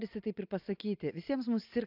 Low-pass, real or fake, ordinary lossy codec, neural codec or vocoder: 5.4 kHz; real; AAC, 48 kbps; none